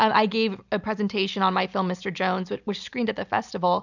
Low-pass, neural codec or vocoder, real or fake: 7.2 kHz; none; real